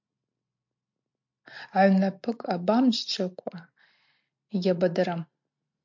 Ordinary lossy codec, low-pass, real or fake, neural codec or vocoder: MP3, 48 kbps; 7.2 kHz; real; none